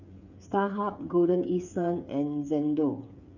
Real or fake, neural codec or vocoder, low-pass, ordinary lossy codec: fake; codec, 16 kHz, 8 kbps, FreqCodec, smaller model; 7.2 kHz; none